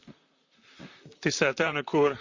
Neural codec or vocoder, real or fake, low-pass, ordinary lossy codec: vocoder, 44.1 kHz, 128 mel bands, Pupu-Vocoder; fake; 7.2 kHz; Opus, 64 kbps